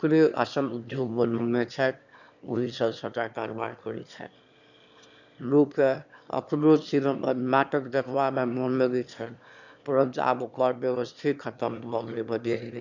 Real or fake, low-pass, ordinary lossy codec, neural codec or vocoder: fake; 7.2 kHz; none; autoencoder, 22.05 kHz, a latent of 192 numbers a frame, VITS, trained on one speaker